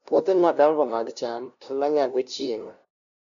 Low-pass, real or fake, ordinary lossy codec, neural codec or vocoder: 7.2 kHz; fake; none; codec, 16 kHz, 0.5 kbps, FunCodec, trained on LibriTTS, 25 frames a second